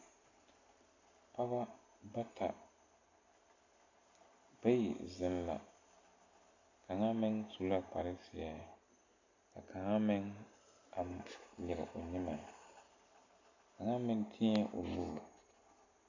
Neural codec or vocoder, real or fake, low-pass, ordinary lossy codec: none; real; 7.2 kHz; AAC, 48 kbps